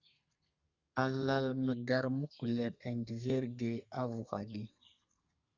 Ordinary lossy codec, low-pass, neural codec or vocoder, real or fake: Opus, 64 kbps; 7.2 kHz; codec, 32 kHz, 1.9 kbps, SNAC; fake